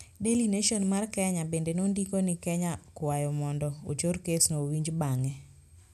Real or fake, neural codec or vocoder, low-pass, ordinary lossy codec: real; none; 14.4 kHz; none